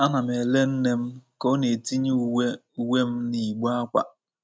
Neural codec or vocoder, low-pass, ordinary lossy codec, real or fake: none; none; none; real